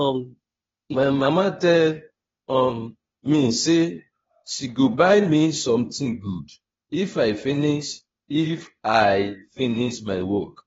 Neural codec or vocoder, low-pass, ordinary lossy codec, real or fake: codec, 16 kHz, 0.8 kbps, ZipCodec; 7.2 kHz; AAC, 24 kbps; fake